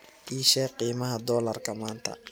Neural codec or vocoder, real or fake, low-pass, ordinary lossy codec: none; real; none; none